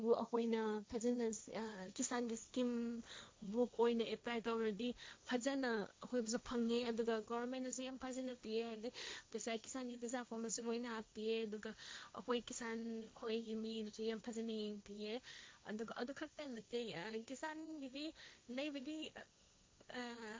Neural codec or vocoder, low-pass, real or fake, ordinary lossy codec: codec, 16 kHz, 1.1 kbps, Voila-Tokenizer; none; fake; none